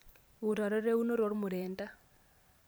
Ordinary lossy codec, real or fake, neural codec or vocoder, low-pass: none; fake; vocoder, 44.1 kHz, 128 mel bands every 512 samples, BigVGAN v2; none